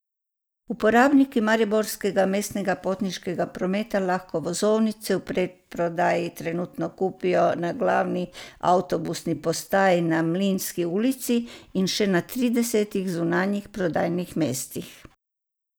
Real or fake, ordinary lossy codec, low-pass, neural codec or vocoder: real; none; none; none